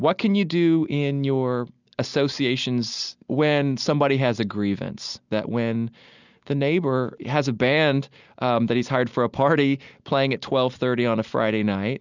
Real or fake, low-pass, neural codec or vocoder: real; 7.2 kHz; none